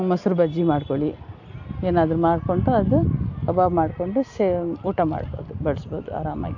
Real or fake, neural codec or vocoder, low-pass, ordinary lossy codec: real; none; 7.2 kHz; AAC, 48 kbps